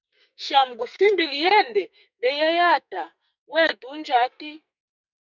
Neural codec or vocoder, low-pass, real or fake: codec, 44.1 kHz, 2.6 kbps, SNAC; 7.2 kHz; fake